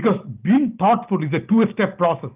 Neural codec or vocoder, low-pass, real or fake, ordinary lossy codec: none; 3.6 kHz; real; Opus, 24 kbps